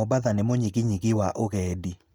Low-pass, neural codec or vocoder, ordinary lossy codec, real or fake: none; none; none; real